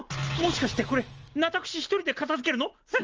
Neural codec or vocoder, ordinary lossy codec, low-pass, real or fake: none; Opus, 24 kbps; 7.2 kHz; real